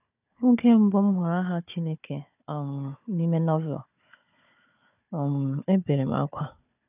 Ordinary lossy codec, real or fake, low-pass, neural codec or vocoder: none; fake; 3.6 kHz; codec, 16 kHz, 4 kbps, FunCodec, trained on Chinese and English, 50 frames a second